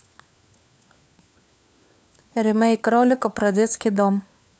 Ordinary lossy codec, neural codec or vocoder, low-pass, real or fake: none; codec, 16 kHz, 4 kbps, FunCodec, trained on LibriTTS, 50 frames a second; none; fake